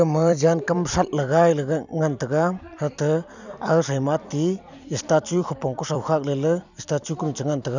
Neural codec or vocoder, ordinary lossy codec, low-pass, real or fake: vocoder, 44.1 kHz, 128 mel bands every 256 samples, BigVGAN v2; none; 7.2 kHz; fake